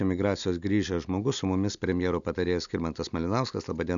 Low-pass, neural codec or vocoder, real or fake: 7.2 kHz; none; real